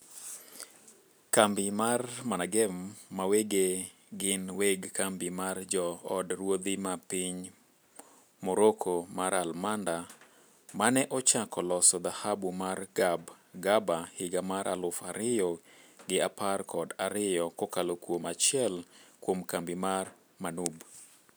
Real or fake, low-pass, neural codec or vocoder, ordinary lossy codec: real; none; none; none